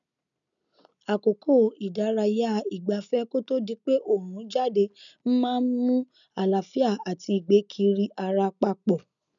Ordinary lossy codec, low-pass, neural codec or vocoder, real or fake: none; 7.2 kHz; none; real